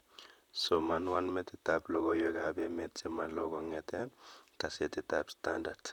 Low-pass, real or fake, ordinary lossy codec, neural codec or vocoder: 19.8 kHz; fake; none; vocoder, 44.1 kHz, 128 mel bands, Pupu-Vocoder